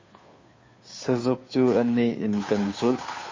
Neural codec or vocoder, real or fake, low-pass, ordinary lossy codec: codec, 16 kHz, 2 kbps, FunCodec, trained on Chinese and English, 25 frames a second; fake; 7.2 kHz; MP3, 32 kbps